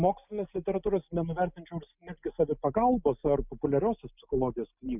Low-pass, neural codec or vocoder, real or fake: 3.6 kHz; none; real